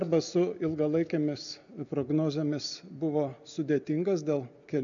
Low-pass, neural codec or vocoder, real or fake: 7.2 kHz; none; real